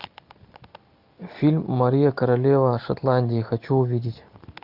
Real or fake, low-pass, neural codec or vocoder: real; 5.4 kHz; none